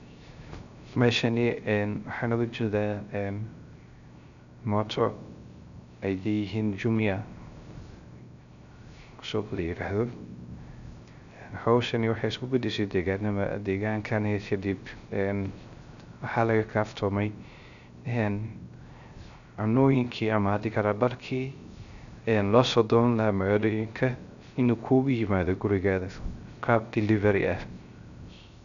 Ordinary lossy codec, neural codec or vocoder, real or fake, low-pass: none; codec, 16 kHz, 0.3 kbps, FocalCodec; fake; 7.2 kHz